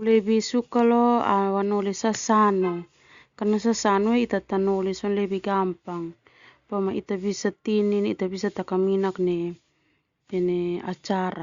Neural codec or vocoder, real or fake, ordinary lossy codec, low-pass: none; real; Opus, 64 kbps; 7.2 kHz